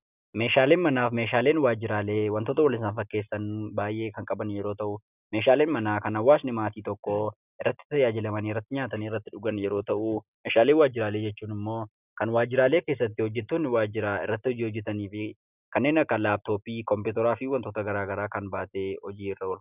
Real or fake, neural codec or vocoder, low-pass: real; none; 3.6 kHz